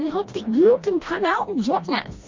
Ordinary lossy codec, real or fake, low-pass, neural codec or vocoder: MP3, 48 kbps; fake; 7.2 kHz; codec, 16 kHz, 1 kbps, FreqCodec, smaller model